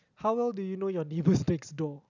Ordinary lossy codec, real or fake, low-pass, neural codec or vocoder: none; real; 7.2 kHz; none